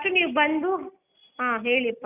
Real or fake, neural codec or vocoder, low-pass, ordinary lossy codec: real; none; 3.6 kHz; none